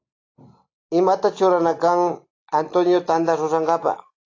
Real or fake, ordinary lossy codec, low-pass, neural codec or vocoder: real; AAC, 32 kbps; 7.2 kHz; none